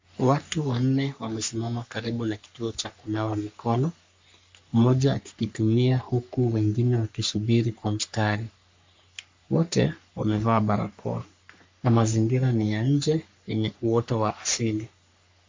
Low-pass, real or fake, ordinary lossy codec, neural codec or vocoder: 7.2 kHz; fake; MP3, 48 kbps; codec, 44.1 kHz, 3.4 kbps, Pupu-Codec